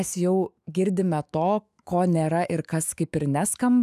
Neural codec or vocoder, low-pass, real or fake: autoencoder, 48 kHz, 128 numbers a frame, DAC-VAE, trained on Japanese speech; 14.4 kHz; fake